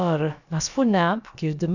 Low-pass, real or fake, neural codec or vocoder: 7.2 kHz; fake; codec, 16 kHz, about 1 kbps, DyCAST, with the encoder's durations